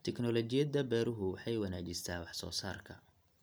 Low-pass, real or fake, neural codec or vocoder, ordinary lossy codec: none; real; none; none